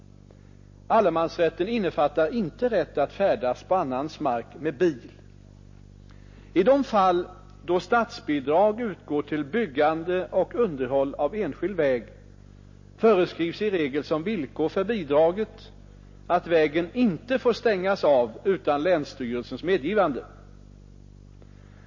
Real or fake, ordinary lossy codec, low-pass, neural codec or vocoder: real; MP3, 32 kbps; 7.2 kHz; none